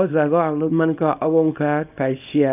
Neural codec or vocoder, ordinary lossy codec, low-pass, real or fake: codec, 24 kHz, 0.9 kbps, WavTokenizer, small release; none; 3.6 kHz; fake